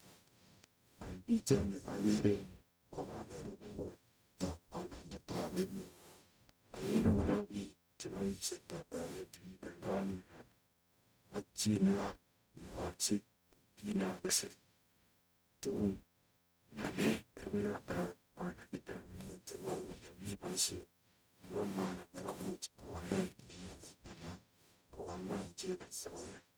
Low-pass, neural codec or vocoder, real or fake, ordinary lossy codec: none; codec, 44.1 kHz, 0.9 kbps, DAC; fake; none